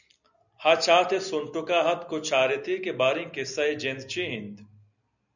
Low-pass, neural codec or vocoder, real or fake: 7.2 kHz; none; real